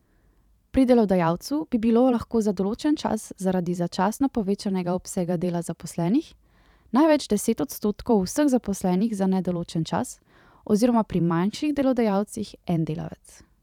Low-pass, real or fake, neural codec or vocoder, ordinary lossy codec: 19.8 kHz; fake; vocoder, 44.1 kHz, 128 mel bands every 512 samples, BigVGAN v2; none